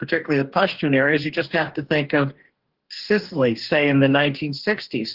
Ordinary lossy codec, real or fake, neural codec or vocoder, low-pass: Opus, 16 kbps; fake; codec, 44.1 kHz, 2.6 kbps, DAC; 5.4 kHz